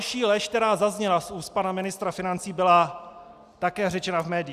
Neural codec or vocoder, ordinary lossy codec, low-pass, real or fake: none; Opus, 64 kbps; 14.4 kHz; real